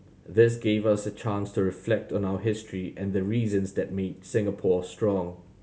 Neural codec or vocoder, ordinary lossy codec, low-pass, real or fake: none; none; none; real